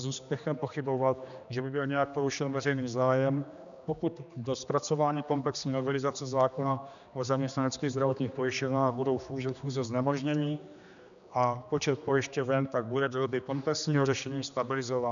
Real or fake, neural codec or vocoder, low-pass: fake; codec, 16 kHz, 2 kbps, X-Codec, HuBERT features, trained on general audio; 7.2 kHz